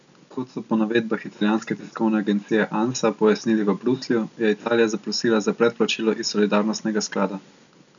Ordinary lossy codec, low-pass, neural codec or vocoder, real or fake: none; 7.2 kHz; none; real